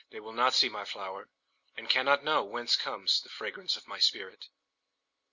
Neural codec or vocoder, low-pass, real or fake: none; 7.2 kHz; real